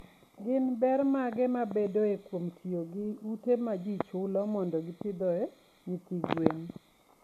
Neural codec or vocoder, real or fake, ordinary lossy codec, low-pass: none; real; none; 14.4 kHz